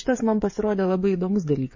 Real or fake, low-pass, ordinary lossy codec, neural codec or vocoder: fake; 7.2 kHz; MP3, 32 kbps; codec, 16 kHz, 16 kbps, FreqCodec, smaller model